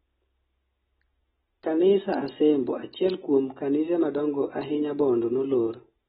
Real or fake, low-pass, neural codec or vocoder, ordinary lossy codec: real; 7.2 kHz; none; AAC, 16 kbps